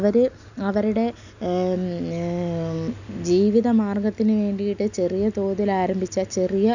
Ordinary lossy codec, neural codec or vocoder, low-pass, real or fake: none; none; 7.2 kHz; real